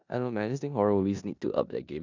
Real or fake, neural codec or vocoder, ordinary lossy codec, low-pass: fake; codec, 16 kHz in and 24 kHz out, 0.9 kbps, LongCat-Audio-Codec, four codebook decoder; none; 7.2 kHz